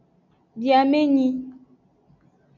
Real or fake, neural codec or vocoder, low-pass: real; none; 7.2 kHz